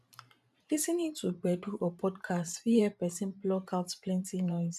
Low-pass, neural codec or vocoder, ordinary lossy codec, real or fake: 14.4 kHz; vocoder, 44.1 kHz, 128 mel bands every 512 samples, BigVGAN v2; none; fake